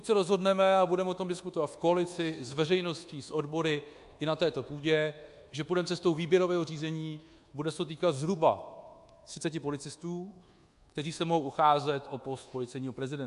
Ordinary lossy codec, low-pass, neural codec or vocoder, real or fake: AAC, 64 kbps; 10.8 kHz; codec, 24 kHz, 1.2 kbps, DualCodec; fake